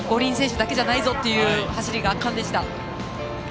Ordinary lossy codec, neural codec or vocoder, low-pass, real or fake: none; none; none; real